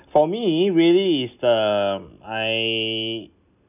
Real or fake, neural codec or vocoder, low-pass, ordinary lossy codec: real; none; 3.6 kHz; none